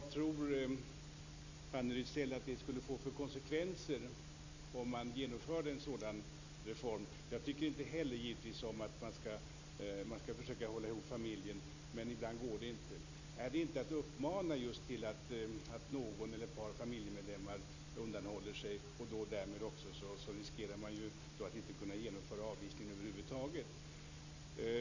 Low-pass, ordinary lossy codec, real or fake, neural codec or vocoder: 7.2 kHz; AAC, 48 kbps; real; none